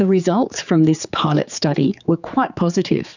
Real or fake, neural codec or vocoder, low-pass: fake; codec, 16 kHz, 4 kbps, X-Codec, HuBERT features, trained on general audio; 7.2 kHz